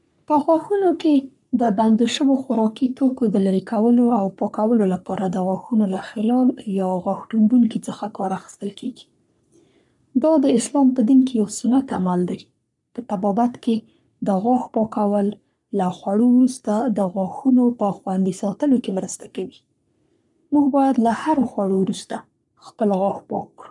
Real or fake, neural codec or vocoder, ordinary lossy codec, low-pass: fake; codec, 44.1 kHz, 3.4 kbps, Pupu-Codec; none; 10.8 kHz